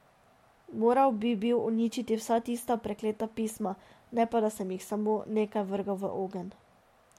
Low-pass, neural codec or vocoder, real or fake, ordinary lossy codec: 19.8 kHz; none; real; MP3, 64 kbps